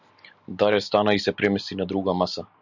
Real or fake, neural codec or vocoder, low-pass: real; none; 7.2 kHz